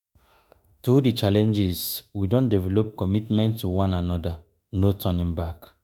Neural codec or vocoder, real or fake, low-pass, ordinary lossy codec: autoencoder, 48 kHz, 32 numbers a frame, DAC-VAE, trained on Japanese speech; fake; none; none